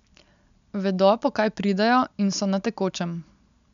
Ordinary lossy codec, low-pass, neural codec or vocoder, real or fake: none; 7.2 kHz; none; real